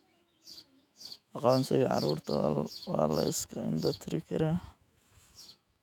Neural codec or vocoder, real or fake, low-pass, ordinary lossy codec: autoencoder, 48 kHz, 128 numbers a frame, DAC-VAE, trained on Japanese speech; fake; 19.8 kHz; none